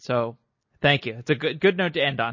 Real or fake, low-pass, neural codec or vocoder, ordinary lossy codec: real; 7.2 kHz; none; MP3, 32 kbps